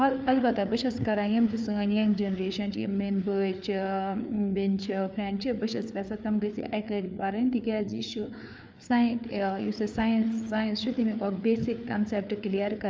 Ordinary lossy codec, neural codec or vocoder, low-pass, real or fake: none; codec, 16 kHz, 4 kbps, FreqCodec, larger model; none; fake